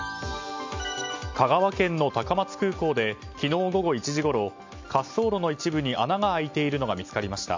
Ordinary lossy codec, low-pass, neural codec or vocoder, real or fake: MP3, 48 kbps; 7.2 kHz; none; real